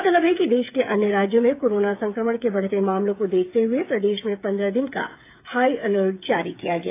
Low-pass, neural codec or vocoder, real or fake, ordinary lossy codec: 3.6 kHz; codec, 16 kHz, 8 kbps, FreqCodec, smaller model; fake; AAC, 24 kbps